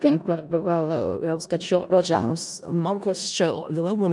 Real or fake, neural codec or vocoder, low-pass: fake; codec, 16 kHz in and 24 kHz out, 0.4 kbps, LongCat-Audio-Codec, four codebook decoder; 10.8 kHz